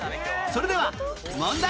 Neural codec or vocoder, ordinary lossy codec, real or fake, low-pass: none; none; real; none